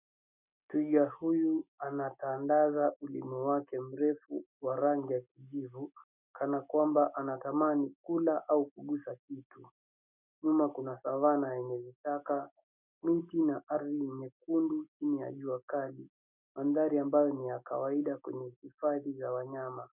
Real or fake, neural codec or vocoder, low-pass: real; none; 3.6 kHz